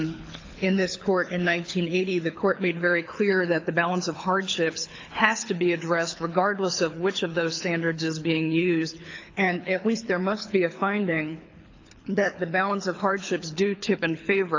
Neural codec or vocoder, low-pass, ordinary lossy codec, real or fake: codec, 24 kHz, 6 kbps, HILCodec; 7.2 kHz; AAC, 48 kbps; fake